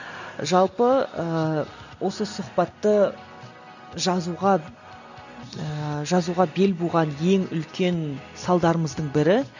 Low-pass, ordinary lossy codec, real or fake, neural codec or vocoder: 7.2 kHz; none; real; none